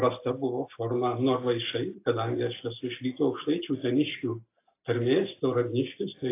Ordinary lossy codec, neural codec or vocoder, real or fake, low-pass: AAC, 24 kbps; none; real; 3.6 kHz